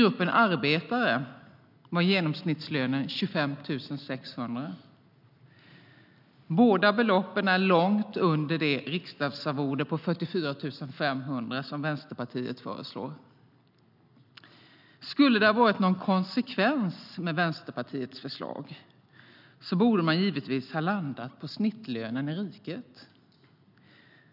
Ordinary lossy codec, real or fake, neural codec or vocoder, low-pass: none; real; none; 5.4 kHz